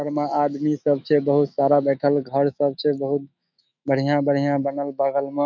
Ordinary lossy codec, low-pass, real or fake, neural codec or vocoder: none; 7.2 kHz; fake; autoencoder, 48 kHz, 128 numbers a frame, DAC-VAE, trained on Japanese speech